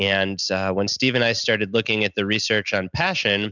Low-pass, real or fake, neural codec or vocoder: 7.2 kHz; real; none